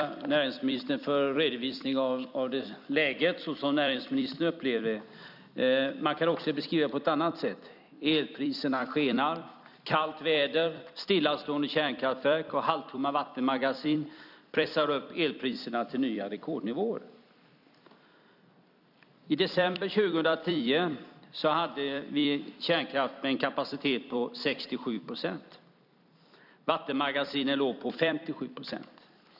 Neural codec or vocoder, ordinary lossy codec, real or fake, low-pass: vocoder, 44.1 kHz, 128 mel bands every 256 samples, BigVGAN v2; none; fake; 5.4 kHz